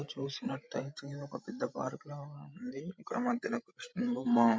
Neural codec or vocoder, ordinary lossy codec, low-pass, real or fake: codec, 16 kHz, 16 kbps, FreqCodec, larger model; none; none; fake